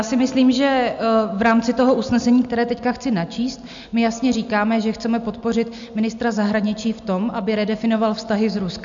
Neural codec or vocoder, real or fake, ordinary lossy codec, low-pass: none; real; MP3, 64 kbps; 7.2 kHz